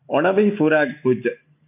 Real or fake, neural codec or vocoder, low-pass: real; none; 3.6 kHz